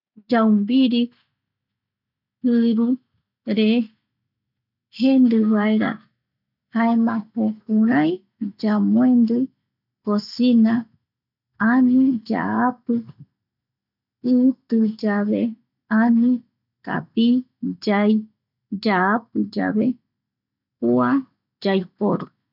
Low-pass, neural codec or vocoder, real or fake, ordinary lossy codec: 5.4 kHz; none; real; none